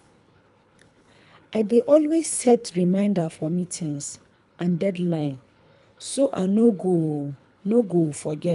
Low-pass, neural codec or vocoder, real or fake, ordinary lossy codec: 10.8 kHz; codec, 24 kHz, 3 kbps, HILCodec; fake; none